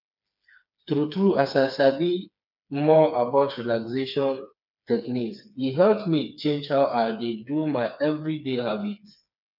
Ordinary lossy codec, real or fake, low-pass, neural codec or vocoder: none; fake; 5.4 kHz; codec, 16 kHz, 4 kbps, FreqCodec, smaller model